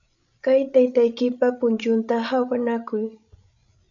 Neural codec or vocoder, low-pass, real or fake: codec, 16 kHz, 16 kbps, FreqCodec, larger model; 7.2 kHz; fake